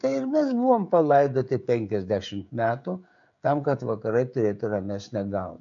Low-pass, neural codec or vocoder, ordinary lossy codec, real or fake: 7.2 kHz; codec, 16 kHz, 16 kbps, FreqCodec, smaller model; MP3, 64 kbps; fake